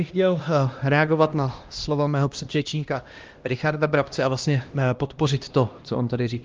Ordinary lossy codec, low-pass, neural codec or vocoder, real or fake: Opus, 32 kbps; 7.2 kHz; codec, 16 kHz, 1 kbps, X-Codec, HuBERT features, trained on LibriSpeech; fake